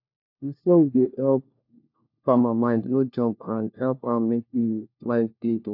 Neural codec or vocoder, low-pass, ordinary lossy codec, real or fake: codec, 16 kHz, 1 kbps, FunCodec, trained on LibriTTS, 50 frames a second; 5.4 kHz; AAC, 48 kbps; fake